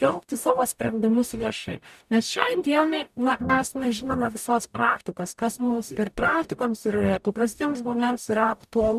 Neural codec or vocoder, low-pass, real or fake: codec, 44.1 kHz, 0.9 kbps, DAC; 14.4 kHz; fake